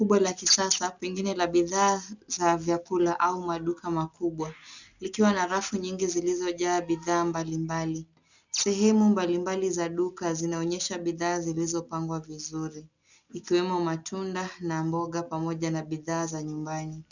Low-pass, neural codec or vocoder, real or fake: 7.2 kHz; none; real